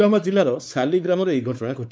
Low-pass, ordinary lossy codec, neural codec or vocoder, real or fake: none; none; codec, 16 kHz, 4 kbps, X-Codec, WavLM features, trained on Multilingual LibriSpeech; fake